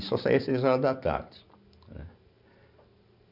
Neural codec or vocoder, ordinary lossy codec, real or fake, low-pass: none; none; real; 5.4 kHz